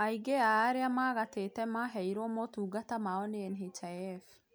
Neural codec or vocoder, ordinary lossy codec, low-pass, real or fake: none; none; none; real